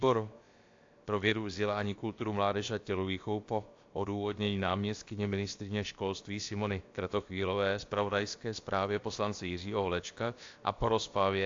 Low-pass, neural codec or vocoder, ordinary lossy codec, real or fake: 7.2 kHz; codec, 16 kHz, about 1 kbps, DyCAST, with the encoder's durations; AAC, 48 kbps; fake